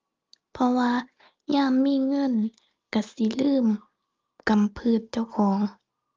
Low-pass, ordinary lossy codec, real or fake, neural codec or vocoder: 7.2 kHz; Opus, 24 kbps; real; none